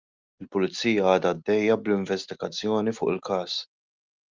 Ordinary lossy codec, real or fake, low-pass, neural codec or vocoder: Opus, 24 kbps; real; 7.2 kHz; none